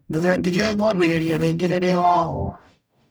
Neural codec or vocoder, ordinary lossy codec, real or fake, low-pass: codec, 44.1 kHz, 0.9 kbps, DAC; none; fake; none